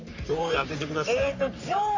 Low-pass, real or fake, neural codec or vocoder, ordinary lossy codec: 7.2 kHz; fake; codec, 44.1 kHz, 3.4 kbps, Pupu-Codec; AAC, 48 kbps